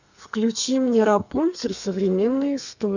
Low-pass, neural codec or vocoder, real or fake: 7.2 kHz; codec, 32 kHz, 1.9 kbps, SNAC; fake